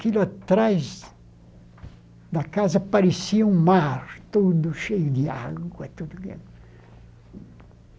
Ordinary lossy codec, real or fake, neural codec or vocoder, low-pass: none; real; none; none